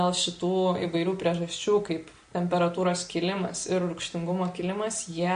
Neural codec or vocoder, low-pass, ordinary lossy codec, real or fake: none; 9.9 kHz; MP3, 48 kbps; real